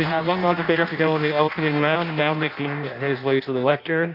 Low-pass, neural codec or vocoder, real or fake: 5.4 kHz; codec, 16 kHz in and 24 kHz out, 0.6 kbps, FireRedTTS-2 codec; fake